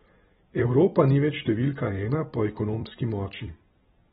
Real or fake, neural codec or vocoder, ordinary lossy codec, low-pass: fake; vocoder, 44.1 kHz, 128 mel bands every 256 samples, BigVGAN v2; AAC, 16 kbps; 19.8 kHz